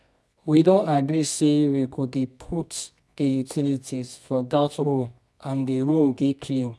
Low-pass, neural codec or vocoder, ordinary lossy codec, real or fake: none; codec, 24 kHz, 0.9 kbps, WavTokenizer, medium music audio release; none; fake